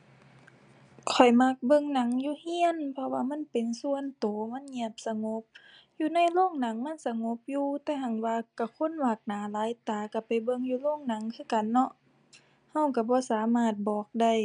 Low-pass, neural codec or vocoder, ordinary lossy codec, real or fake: 9.9 kHz; none; none; real